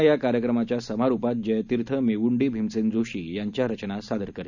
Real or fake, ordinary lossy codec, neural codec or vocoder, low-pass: real; none; none; 7.2 kHz